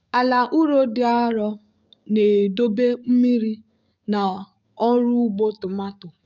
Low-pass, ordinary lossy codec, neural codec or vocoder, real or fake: 7.2 kHz; none; codec, 44.1 kHz, 7.8 kbps, DAC; fake